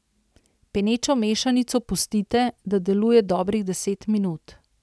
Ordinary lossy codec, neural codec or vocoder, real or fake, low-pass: none; none; real; none